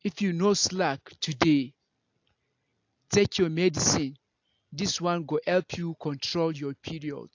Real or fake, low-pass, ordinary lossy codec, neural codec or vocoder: real; 7.2 kHz; AAC, 48 kbps; none